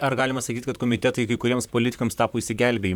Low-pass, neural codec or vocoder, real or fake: 19.8 kHz; vocoder, 44.1 kHz, 128 mel bands, Pupu-Vocoder; fake